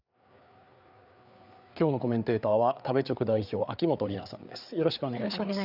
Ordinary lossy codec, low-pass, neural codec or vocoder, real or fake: none; 5.4 kHz; codec, 16 kHz, 4 kbps, FreqCodec, larger model; fake